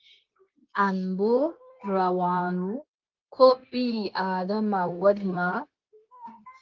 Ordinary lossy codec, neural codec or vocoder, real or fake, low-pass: Opus, 32 kbps; codec, 16 kHz, 0.9 kbps, LongCat-Audio-Codec; fake; 7.2 kHz